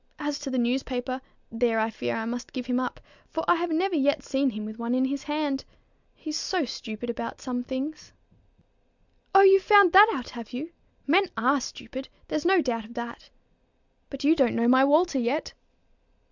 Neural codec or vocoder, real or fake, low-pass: none; real; 7.2 kHz